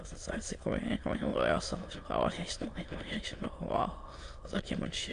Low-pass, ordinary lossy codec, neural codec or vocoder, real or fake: 9.9 kHz; AAC, 48 kbps; autoencoder, 22.05 kHz, a latent of 192 numbers a frame, VITS, trained on many speakers; fake